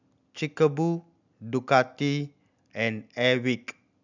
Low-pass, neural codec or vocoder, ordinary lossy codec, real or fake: 7.2 kHz; none; none; real